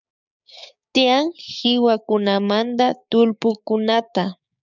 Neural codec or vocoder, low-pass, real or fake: codec, 16 kHz, 6 kbps, DAC; 7.2 kHz; fake